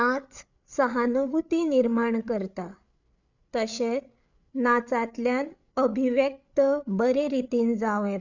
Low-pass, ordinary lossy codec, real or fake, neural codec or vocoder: 7.2 kHz; none; fake; codec, 16 kHz, 8 kbps, FreqCodec, larger model